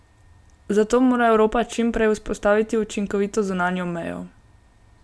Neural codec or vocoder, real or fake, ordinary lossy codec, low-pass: none; real; none; none